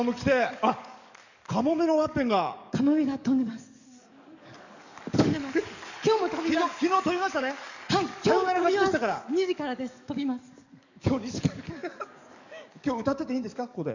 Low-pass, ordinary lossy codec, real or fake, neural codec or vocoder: 7.2 kHz; none; fake; codec, 44.1 kHz, 7.8 kbps, DAC